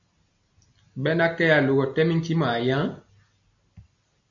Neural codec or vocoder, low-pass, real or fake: none; 7.2 kHz; real